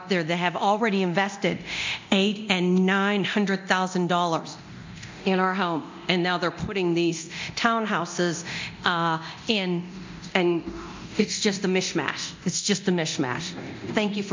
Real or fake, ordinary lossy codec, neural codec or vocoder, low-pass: fake; MP3, 64 kbps; codec, 24 kHz, 0.9 kbps, DualCodec; 7.2 kHz